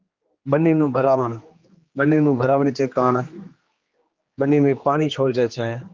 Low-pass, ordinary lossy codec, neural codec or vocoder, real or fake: 7.2 kHz; Opus, 32 kbps; codec, 16 kHz, 2 kbps, X-Codec, HuBERT features, trained on general audio; fake